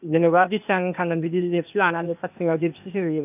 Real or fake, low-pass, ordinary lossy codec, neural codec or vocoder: fake; 3.6 kHz; none; codec, 16 kHz, 0.8 kbps, ZipCodec